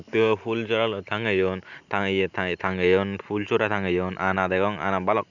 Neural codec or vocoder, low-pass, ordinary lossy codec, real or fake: none; 7.2 kHz; none; real